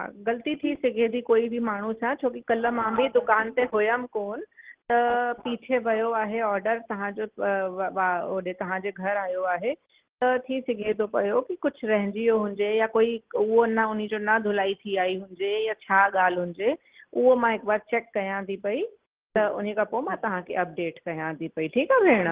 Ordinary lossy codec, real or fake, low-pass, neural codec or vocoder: Opus, 16 kbps; real; 3.6 kHz; none